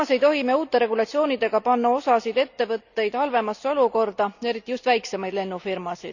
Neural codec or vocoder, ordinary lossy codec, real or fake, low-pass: none; none; real; 7.2 kHz